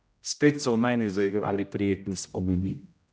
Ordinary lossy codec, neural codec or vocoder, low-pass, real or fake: none; codec, 16 kHz, 0.5 kbps, X-Codec, HuBERT features, trained on general audio; none; fake